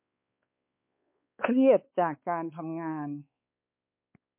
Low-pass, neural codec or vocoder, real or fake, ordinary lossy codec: 3.6 kHz; codec, 16 kHz, 4 kbps, X-Codec, WavLM features, trained on Multilingual LibriSpeech; fake; MP3, 32 kbps